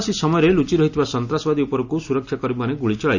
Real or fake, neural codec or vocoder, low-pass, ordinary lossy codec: real; none; 7.2 kHz; none